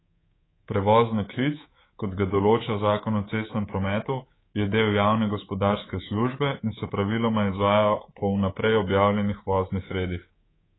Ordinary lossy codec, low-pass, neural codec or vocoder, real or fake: AAC, 16 kbps; 7.2 kHz; codec, 24 kHz, 3.1 kbps, DualCodec; fake